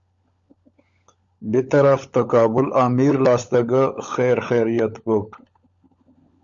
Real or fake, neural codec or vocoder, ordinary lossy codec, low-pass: fake; codec, 16 kHz, 16 kbps, FunCodec, trained on LibriTTS, 50 frames a second; Opus, 64 kbps; 7.2 kHz